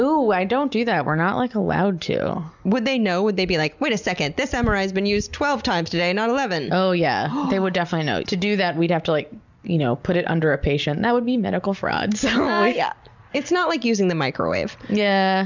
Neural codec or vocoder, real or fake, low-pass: none; real; 7.2 kHz